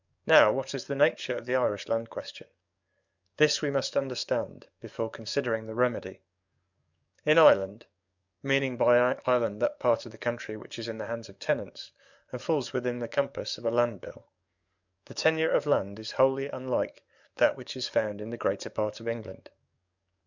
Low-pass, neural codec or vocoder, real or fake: 7.2 kHz; codec, 44.1 kHz, 7.8 kbps, DAC; fake